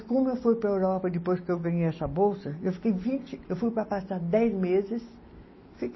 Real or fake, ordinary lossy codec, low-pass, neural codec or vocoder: real; MP3, 24 kbps; 7.2 kHz; none